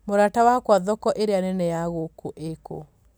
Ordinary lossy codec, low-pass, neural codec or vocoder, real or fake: none; none; none; real